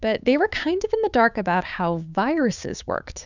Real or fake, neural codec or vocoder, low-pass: fake; codec, 16 kHz, 6 kbps, DAC; 7.2 kHz